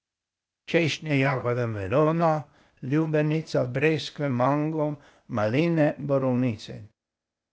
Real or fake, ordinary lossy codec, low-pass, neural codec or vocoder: fake; none; none; codec, 16 kHz, 0.8 kbps, ZipCodec